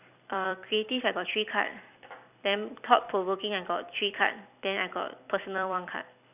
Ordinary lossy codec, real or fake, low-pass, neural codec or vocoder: none; fake; 3.6 kHz; vocoder, 44.1 kHz, 128 mel bands every 256 samples, BigVGAN v2